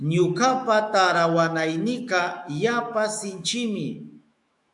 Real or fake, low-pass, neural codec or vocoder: fake; 10.8 kHz; autoencoder, 48 kHz, 128 numbers a frame, DAC-VAE, trained on Japanese speech